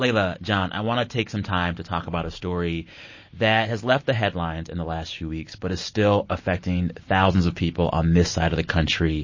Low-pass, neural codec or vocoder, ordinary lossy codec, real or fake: 7.2 kHz; none; MP3, 32 kbps; real